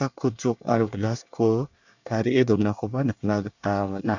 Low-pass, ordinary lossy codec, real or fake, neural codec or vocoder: 7.2 kHz; none; fake; codec, 24 kHz, 1 kbps, SNAC